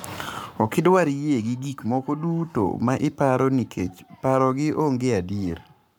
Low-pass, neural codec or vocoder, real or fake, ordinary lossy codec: none; codec, 44.1 kHz, 7.8 kbps, Pupu-Codec; fake; none